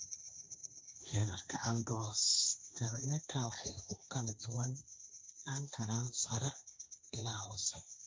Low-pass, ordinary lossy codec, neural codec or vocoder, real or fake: none; none; codec, 16 kHz, 1.1 kbps, Voila-Tokenizer; fake